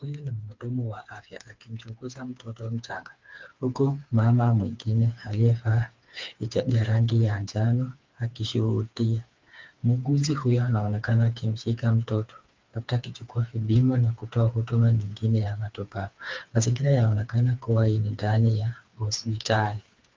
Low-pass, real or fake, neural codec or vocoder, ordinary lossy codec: 7.2 kHz; fake; codec, 16 kHz, 4 kbps, FreqCodec, smaller model; Opus, 24 kbps